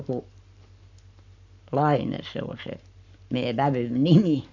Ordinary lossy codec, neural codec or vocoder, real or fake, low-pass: none; codec, 44.1 kHz, 7.8 kbps, Pupu-Codec; fake; 7.2 kHz